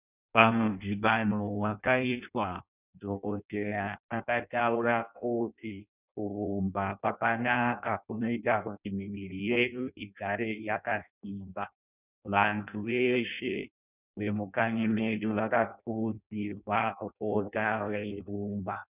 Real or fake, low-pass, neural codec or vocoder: fake; 3.6 kHz; codec, 16 kHz in and 24 kHz out, 0.6 kbps, FireRedTTS-2 codec